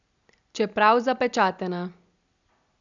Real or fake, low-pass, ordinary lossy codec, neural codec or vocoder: real; 7.2 kHz; none; none